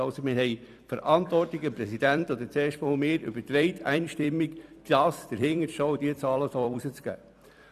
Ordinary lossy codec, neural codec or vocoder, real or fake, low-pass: none; vocoder, 44.1 kHz, 128 mel bands every 256 samples, BigVGAN v2; fake; 14.4 kHz